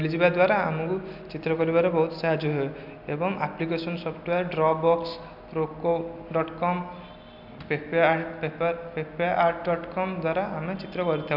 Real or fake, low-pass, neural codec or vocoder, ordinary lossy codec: real; 5.4 kHz; none; none